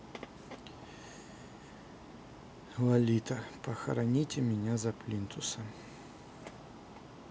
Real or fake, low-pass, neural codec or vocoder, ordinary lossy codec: real; none; none; none